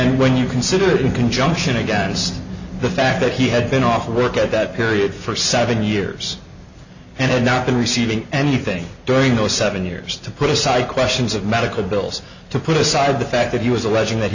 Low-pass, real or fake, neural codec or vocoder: 7.2 kHz; real; none